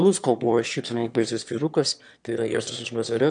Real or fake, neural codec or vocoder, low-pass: fake; autoencoder, 22.05 kHz, a latent of 192 numbers a frame, VITS, trained on one speaker; 9.9 kHz